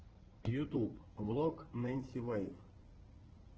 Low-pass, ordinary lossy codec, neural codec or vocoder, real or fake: 7.2 kHz; Opus, 16 kbps; codec, 16 kHz in and 24 kHz out, 2.2 kbps, FireRedTTS-2 codec; fake